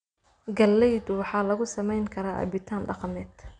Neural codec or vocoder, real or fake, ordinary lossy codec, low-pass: none; real; none; 9.9 kHz